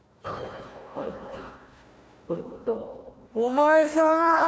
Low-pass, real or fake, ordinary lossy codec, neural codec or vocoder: none; fake; none; codec, 16 kHz, 1 kbps, FunCodec, trained on Chinese and English, 50 frames a second